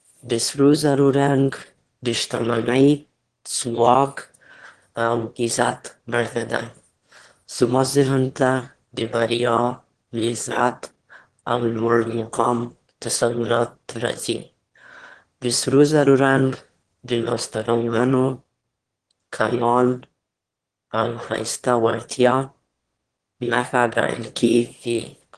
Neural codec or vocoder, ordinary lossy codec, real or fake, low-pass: autoencoder, 22.05 kHz, a latent of 192 numbers a frame, VITS, trained on one speaker; Opus, 16 kbps; fake; 9.9 kHz